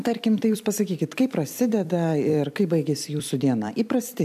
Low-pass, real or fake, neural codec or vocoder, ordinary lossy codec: 14.4 kHz; fake; vocoder, 44.1 kHz, 128 mel bands every 256 samples, BigVGAN v2; MP3, 96 kbps